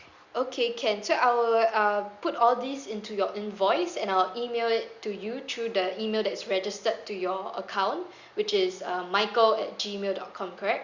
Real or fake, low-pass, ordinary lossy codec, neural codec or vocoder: real; 7.2 kHz; Opus, 64 kbps; none